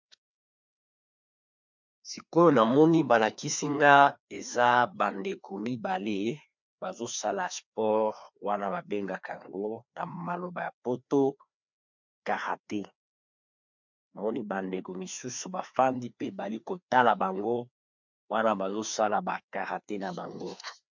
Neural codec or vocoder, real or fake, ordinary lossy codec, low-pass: codec, 16 kHz, 2 kbps, FreqCodec, larger model; fake; MP3, 64 kbps; 7.2 kHz